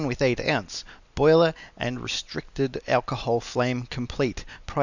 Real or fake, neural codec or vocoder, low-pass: real; none; 7.2 kHz